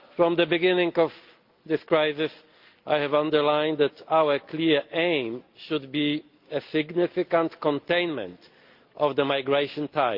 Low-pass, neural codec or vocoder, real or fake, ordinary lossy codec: 5.4 kHz; none; real; Opus, 32 kbps